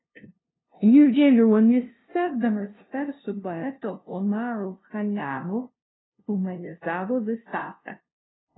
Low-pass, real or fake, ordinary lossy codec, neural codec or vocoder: 7.2 kHz; fake; AAC, 16 kbps; codec, 16 kHz, 0.5 kbps, FunCodec, trained on LibriTTS, 25 frames a second